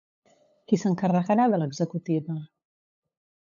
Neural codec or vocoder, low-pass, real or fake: codec, 16 kHz, 8 kbps, FunCodec, trained on LibriTTS, 25 frames a second; 7.2 kHz; fake